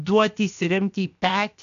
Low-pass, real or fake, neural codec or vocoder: 7.2 kHz; fake; codec, 16 kHz, 0.7 kbps, FocalCodec